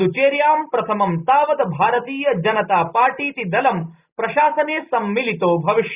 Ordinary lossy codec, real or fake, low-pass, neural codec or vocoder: Opus, 64 kbps; real; 3.6 kHz; none